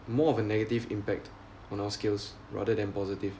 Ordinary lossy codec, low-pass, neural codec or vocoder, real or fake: none; none; none; real